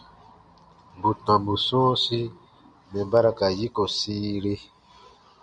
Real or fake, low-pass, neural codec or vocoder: real; 9.9 kHz; none